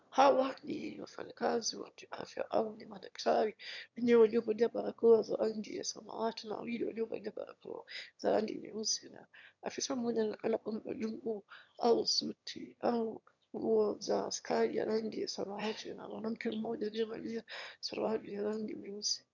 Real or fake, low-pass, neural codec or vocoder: fake; 7.2 kHz; autoencoder, 22.05 kHz, a latent of 192 numbers a frame, VITS, trained on one speaker